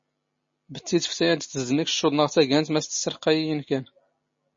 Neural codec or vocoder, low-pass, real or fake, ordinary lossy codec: none; 7.2 kHz; real; MP3, 32 kbps